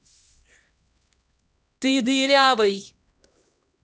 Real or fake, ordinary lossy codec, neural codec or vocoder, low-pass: fake; none; codec, 16 kHz, 1 kbps, X-Codec, HuBERT features, trained on LibriSpeech; none